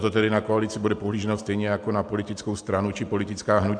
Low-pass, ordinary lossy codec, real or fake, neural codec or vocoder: 9.9 kHz; AAC, 64 kbps; real; none